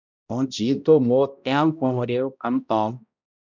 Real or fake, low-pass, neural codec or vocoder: fake; 7.2 kHz; codec, 16 kHz, 0.5 kbps, X-Codec, HuBERT features, trained on balanced general audio